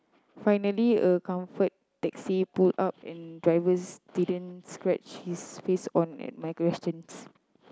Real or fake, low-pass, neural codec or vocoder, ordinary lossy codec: real; none; none; none